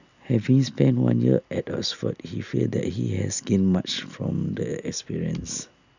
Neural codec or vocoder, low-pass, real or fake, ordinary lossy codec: none; 7.2 kHz; real; none